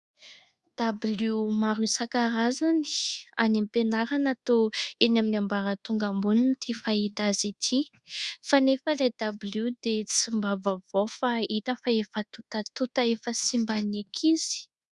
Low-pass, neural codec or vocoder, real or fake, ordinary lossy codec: 10.8 kHz; codec, 24 kHz, 1.2 kbps, DualCodec; fake; Opus, 64 kbps